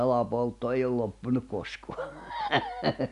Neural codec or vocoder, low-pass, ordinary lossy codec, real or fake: none; 10.8 kHz; none; real